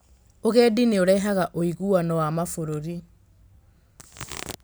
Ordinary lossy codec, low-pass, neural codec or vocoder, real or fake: none; none; none; real